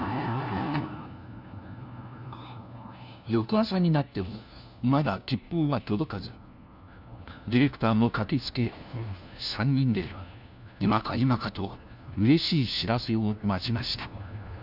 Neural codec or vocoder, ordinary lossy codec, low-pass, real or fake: codec, 16 kHz, 1 kbps, FunCodec, trained on LibriTTS, 50 frames a second; none; 5.4 kHz; fake